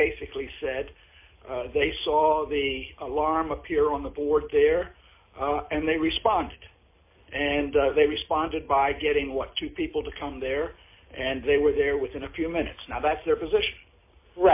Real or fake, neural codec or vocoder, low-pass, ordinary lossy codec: real; none; 3.6 kHz; MP3, 24 kbps